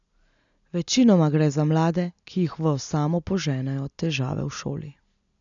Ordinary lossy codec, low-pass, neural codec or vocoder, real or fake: none; 7.2 kHz; none; real